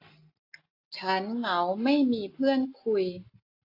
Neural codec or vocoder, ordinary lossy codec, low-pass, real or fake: none; AAC, 32 kbps; 5.4 kHz; real